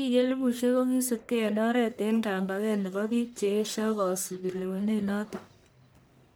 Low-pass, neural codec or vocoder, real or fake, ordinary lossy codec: none; codec, 44.1 kHz, 1.7 kbps, Pupu-Codec; fake; none